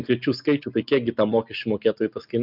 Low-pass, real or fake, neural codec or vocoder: 5.4 kHz; real; none